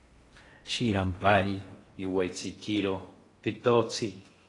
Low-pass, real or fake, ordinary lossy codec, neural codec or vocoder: 10.8 kHz; fake; AAC, 32 kbps; codec, 16 kHz in and 24 kHz out, 0.6 kbps, FocalCodec, streaming, 4096 codes